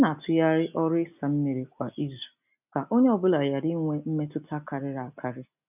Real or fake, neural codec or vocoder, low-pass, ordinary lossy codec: real; none; 3.6 kHz; none